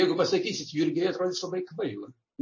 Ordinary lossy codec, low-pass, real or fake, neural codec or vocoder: MP3, 32 kbps; 7.2 kHz; real; none